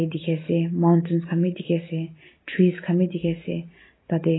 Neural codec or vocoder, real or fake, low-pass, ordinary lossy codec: none; real; 7.2 kHz; AAC, 16 kbps